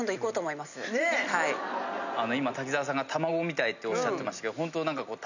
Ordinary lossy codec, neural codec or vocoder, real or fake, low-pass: none; none; real; 7.2 kHz